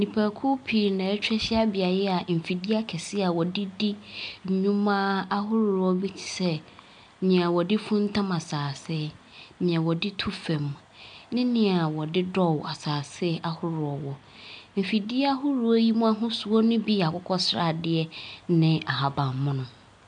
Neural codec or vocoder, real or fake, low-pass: none; real; 9.9 kHz